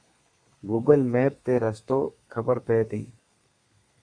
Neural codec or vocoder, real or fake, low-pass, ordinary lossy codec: codec, 32 kHz, 1.9 kbps, SNAC; fake; 9.9 kHz; Opus, 64 kbps